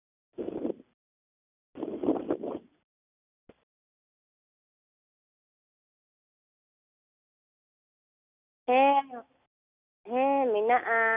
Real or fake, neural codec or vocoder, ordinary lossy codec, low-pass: real; none; none; 3.6 kHz